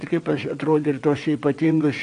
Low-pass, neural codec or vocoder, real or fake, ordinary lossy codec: 9.9 kHz; vocoder, 22.05 kHz, 80 mel bands, WaveNeXt; fake; AAC, 48 kbps